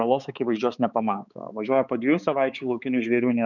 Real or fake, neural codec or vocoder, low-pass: fake; codec, 16 kHz, 4 kbps, X-Codec, HuBERT features, trained on balanced general audio; 7.2 kHz